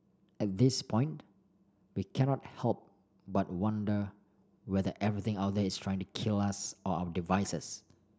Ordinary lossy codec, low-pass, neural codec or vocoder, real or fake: none; none; none; real